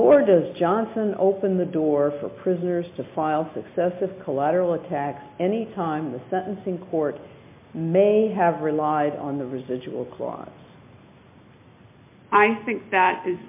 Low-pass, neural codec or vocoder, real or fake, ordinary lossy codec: 3.6 kHz; none; real; MP3, 24 kbps